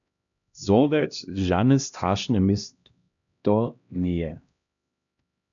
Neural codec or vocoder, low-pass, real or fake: codec, 16 kHz, 1 kbps, X-Codec, HuBERT features, trained on LibriSpeech; 7.2 kHz; fake